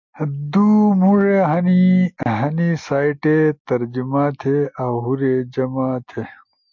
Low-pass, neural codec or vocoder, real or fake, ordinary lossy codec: 7.2 kHz; none; real; MP3, 48 kbps